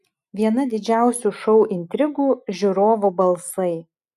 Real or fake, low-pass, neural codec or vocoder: real; 14.4 kHz; none